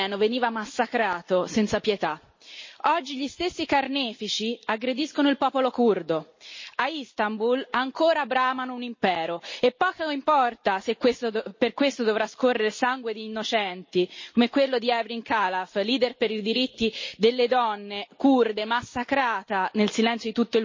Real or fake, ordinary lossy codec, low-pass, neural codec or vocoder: real; MP3, 32 kbps; 7.2 kHz; none